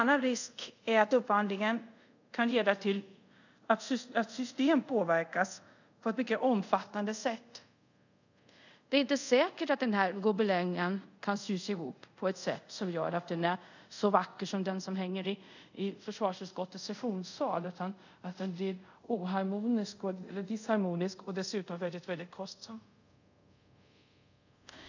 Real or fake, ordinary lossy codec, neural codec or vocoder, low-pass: fake; none; codec, 24 kHz, 0.5 kbps, DualCodec; 7.2 kHz